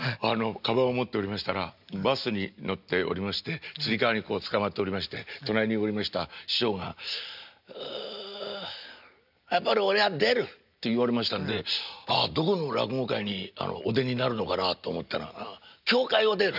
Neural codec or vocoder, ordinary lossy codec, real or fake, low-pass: none; none; real; 5.4 kHz